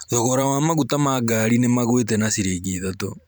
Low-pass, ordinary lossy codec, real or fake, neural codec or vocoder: none; none; fake; vocoder, 44.1 kHz, 128 mel bands every 512 samples, BigVGAN v2